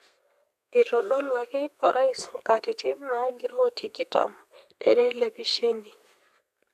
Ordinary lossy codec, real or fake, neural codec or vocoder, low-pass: none; fake; codec, 32 kHz, 1.9 kbps, SNAC; 14.4 kHz